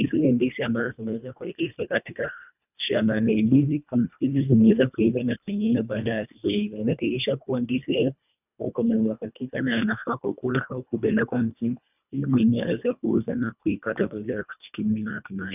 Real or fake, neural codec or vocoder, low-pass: fake; codec, 24 kHz, 1.5 kbps, HILCodec; 3.6 kHz